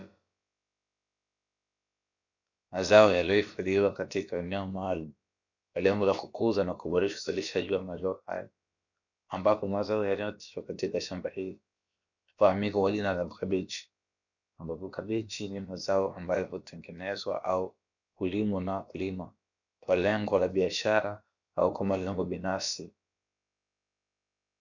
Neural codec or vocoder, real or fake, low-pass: codec, 16 kHz, about 1 kbps, DyCAST, with the encoder's durations; fake; 7.2 kHz